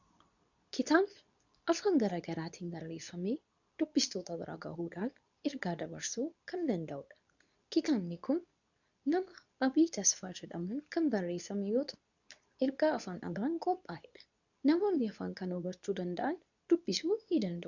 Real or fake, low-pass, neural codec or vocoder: fake; 7.2 kHz; codec, 24 kHz, 0.9 kbps, WavTokenizer, medium speech release version 2